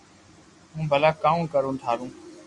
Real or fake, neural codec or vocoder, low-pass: real; none; 10.8 kHz